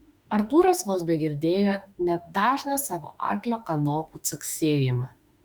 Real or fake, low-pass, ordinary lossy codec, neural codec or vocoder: fake; 19.8 kHz; Opus, 64 kbps; autoencoder, 48 kHz, 32 numbers a frame, DAC-VAE, trained on Japanese speech